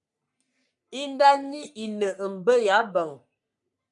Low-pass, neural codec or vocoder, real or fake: 10.8 kHz; codec, 44.1 kHz, 3.4 kbps, Pupu-Codec; fake